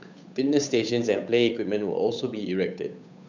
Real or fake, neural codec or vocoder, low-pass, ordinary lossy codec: fake; codec, 16 kHz, 8 kbps, FunCodec, trained on Chinese and English, 25 frames a second; 7.2 kHz; none